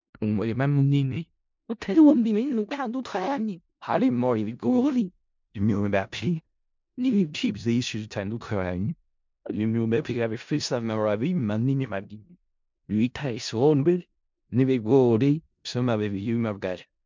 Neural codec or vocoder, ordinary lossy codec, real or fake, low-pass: codec, 16 kHz in and 24 kHz out, 0.4 kbps, LongCat-Audio-Codec, four codebook decoder; MP3, 64 kbps; fake; 7.2 kHz